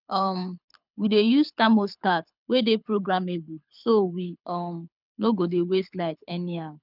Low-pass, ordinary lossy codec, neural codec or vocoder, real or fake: 5.4 kHz; none; codec, 24 kHz, 6 kbps, HILCodec; fake